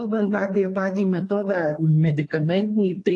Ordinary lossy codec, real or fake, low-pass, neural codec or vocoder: AAC, 48 kbps; fake; 10.8 kHz; codec, 24 kHz, 1 kbps, SNAC